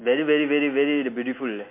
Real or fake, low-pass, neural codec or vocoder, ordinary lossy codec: real; 3.6 kHz; none; MP3, 24 kbps